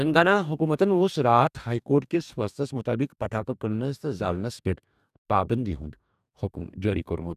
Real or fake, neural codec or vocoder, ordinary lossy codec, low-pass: fake; codec, 44.1 kHz, 2.6 kbps, DAC; none; 14.4 kHz